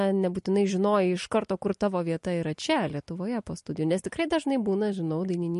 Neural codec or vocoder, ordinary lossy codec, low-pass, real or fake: none; MP3, 48 kbps; 14.4 kHz; real